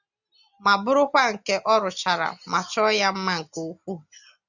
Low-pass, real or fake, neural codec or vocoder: 7.2 kHz; real; none